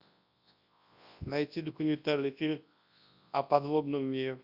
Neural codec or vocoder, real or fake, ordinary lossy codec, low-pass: codec, 24 kHz, 0.9 kbps, WavTokenizer, large speech release; fake; Opus, 64 kbps; 5.4 kHz